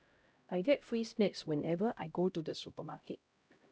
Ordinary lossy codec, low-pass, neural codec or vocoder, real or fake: none; none; codec, 16 kHz, 0.5 kbps, X-Codec, HuBERT features, trained on LibriSpeech; fake